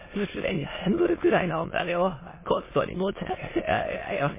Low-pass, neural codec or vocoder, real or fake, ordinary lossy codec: 3.6 kHz; autoencoder, 22.05 kHz, a latent of 192 numbers a frame, VITS, trained on many speakers; fake; MP3, 16 kbps